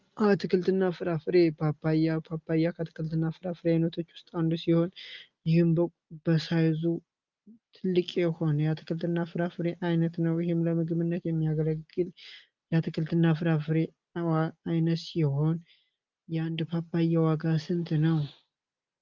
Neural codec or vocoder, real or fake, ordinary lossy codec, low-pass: none; real; Opus, 32 kbps; 7.2 kHz